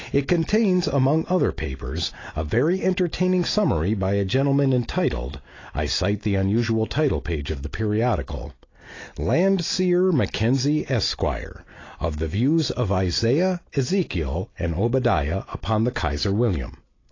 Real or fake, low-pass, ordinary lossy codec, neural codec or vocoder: real; 7.2 kHz; AAC, 32 kbps; none